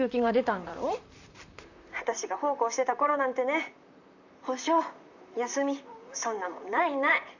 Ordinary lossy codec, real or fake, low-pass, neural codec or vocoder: none; fake; 7.2 kHz; vocoder, 44.1 kHz, 128 mel bands, Pupu-Vocoder